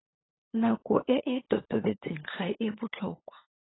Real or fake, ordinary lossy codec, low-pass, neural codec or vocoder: fake; AAC, 16 kbps; 7.2 kHz; codec, 16 kHz, 8 kbps, FunCodec, trained on LibriTTS, 25 frames a second